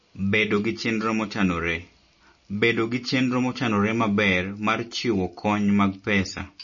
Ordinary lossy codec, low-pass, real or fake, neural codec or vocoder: MP3, 32 kbps; 7.2 kHz; real; none